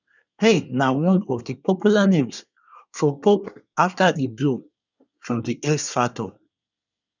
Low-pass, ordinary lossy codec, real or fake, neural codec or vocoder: 7.2 kHz; none; fake; codec, 24 kHz, 1 kbps, SNAC